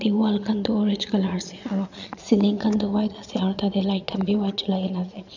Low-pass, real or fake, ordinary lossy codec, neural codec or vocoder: 7.2 kHz; fake; none; vocoder, 22.05 kHz, 80 mel bands, Vocos